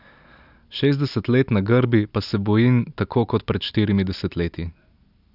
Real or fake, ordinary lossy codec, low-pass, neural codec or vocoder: real; none; 5.4 kHz; none